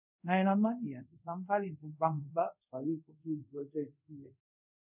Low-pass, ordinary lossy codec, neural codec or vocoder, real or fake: 3.6 kHz; none; codec, 24 kHz, 0.5 kbps, DualCodec; fake